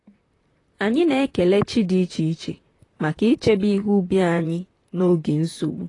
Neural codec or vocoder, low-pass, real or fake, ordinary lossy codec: vocoder, 44.1 kHz, 128 mel bands, Pupu-Vocoder; 10.8 kHz; fake; AAC, 32 kbps